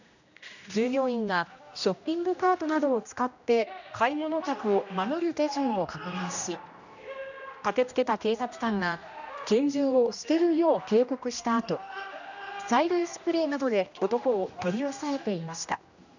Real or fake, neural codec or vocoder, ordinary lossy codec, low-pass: fake; codec, 16 kHz, 1 kbps, X-Codec, HuBERT features, trained on general audio; none; 7.2 kHz